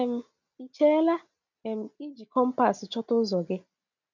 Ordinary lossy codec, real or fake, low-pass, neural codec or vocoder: none; real; 7.2 kHz; none